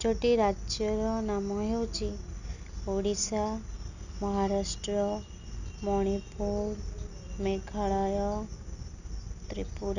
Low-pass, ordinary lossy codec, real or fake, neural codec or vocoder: 7.2 kHz; none; real; none